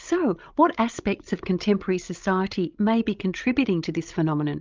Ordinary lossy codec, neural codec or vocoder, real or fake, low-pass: Opus, 24 kbps; codec, 16 kHz, 16 kbps, FreqCodec, larger model; fake; 7.2 kHz